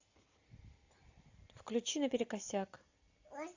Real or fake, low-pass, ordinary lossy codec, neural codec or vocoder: real; 7.2 kHz; AAC, 48 kbps; none